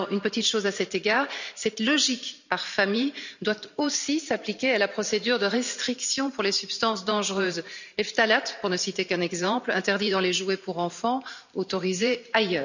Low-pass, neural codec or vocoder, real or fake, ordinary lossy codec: 7.2 kHz; vocoder, 44.1 kHz, 128 mel bands every 512 samples, BigVGAN v2; fake; none